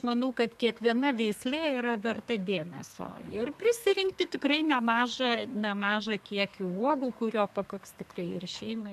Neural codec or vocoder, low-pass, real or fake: codec, 32 kHz, 1.9 kbps, SNAC; 14.4 kHz; fake